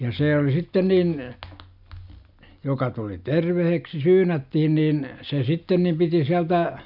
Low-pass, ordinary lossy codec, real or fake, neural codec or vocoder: 5.4 kHz; none; real; none